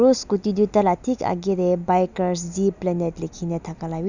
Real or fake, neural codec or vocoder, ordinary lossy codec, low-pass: real; none; none; 7.2 kHz